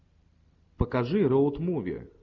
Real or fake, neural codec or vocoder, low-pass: real; none; 7.2 kHz